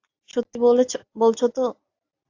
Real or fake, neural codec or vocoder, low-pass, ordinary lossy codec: real; none; 7.2 kHz; AAC, 48 kbps